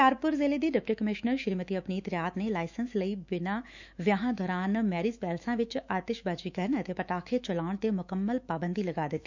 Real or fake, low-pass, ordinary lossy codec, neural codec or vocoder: fake; 7.2 kHz; none; autoencoder, 48 kHz, 128 numbers a frame, DAC-VAE, trained on Japanese speech